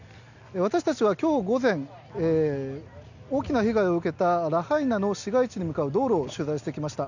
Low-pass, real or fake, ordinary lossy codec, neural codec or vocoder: 7.2 kHz; real; none; none